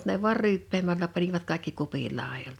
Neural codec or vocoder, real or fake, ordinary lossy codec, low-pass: none; real; none; 19.8 kHz